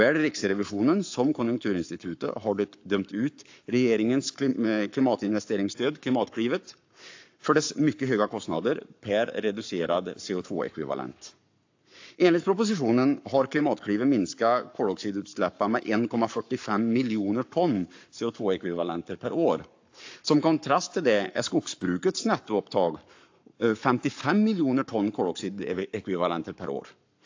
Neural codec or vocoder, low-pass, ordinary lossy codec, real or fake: codec, 44.1 kHz, 7.8 kbps, Pupu-Codec; 7.2 kHz; AAC, 48 kbps; fake